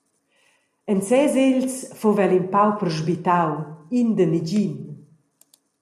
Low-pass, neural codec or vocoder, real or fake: 14.4 kHz; none; real